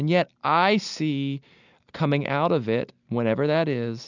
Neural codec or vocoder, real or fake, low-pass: none; real; 7.2 kHz